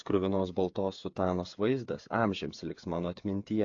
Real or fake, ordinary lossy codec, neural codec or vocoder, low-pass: fake; AAC, 48 kbps; codec, 16 kHz, 8 kbps, FreqCodec, smaller model; 7.2 kHz